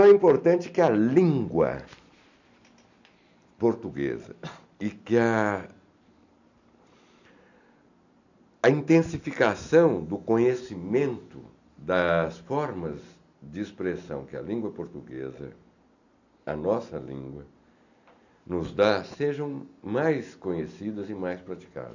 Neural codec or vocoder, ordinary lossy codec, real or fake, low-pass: none; none; real; 7.2 kHz